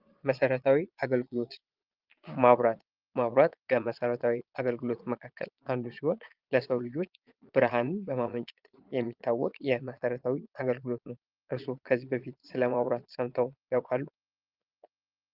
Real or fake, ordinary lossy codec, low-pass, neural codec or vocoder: fake; Opus, 32 kbps; 5.4 kHz; vocoder, 22.05 kHz, 80 mel bands, Vocos